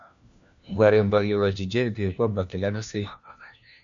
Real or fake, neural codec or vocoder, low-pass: fake; codec, 16 kHz, 1 kbps, FunCodec, trained on LibriTTS, 50 frames a second; 7.2 kHz